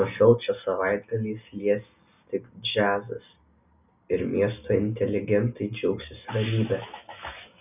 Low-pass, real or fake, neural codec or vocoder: 3.6 kHz; real; none